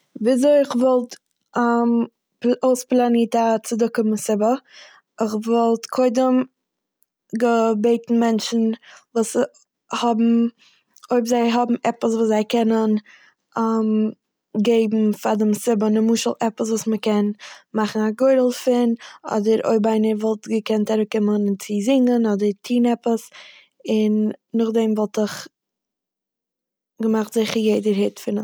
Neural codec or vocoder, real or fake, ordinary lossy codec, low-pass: none; real; none; none